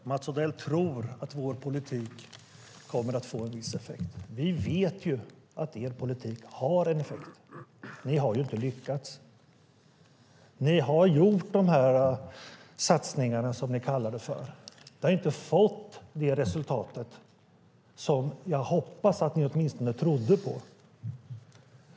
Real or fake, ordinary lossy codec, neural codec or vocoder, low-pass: real; none; none; none